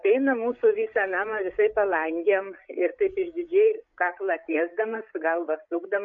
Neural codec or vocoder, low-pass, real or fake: codec, 16 kHz, 16 kbps, FreqCodec, larger model; 7.2 kHz; fake